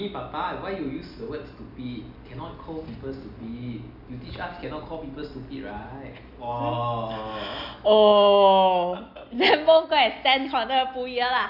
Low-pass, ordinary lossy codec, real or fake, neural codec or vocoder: 5.4 kHz; none; real; none